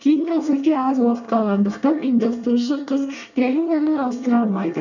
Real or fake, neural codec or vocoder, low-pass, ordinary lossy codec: fake; codec, 24 kHz, 1 kbps, SNAC; 7.2 kHz; none